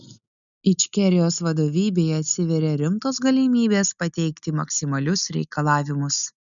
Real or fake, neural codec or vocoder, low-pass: real; none; 7.2 kHz